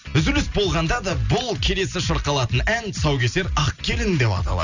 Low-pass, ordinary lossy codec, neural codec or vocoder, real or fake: 7.2 kHz; none; none; real